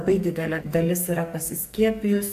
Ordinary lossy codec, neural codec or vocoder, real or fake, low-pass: AAC, 96 kbps; codec, 44.1 kHz, 2.6 kbps, SNAC; fake; 14.4 kHz